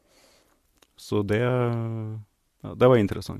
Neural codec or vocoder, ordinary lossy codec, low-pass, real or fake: none; MP3, 64 kbps; 14.4 kHz; real